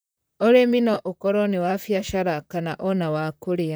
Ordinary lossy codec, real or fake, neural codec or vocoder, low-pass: none; fake; vocoder, 44.1 kHz, 128 mel bands, Pupu-Vocoder; none